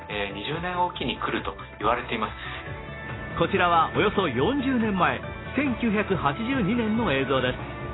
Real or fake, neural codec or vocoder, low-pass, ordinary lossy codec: real; none; 7.2 kHz; AAC, 16 kbps